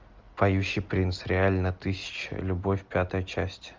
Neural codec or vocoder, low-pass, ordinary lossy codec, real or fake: none; 7.2 kHz; Opus, 24 kbps; real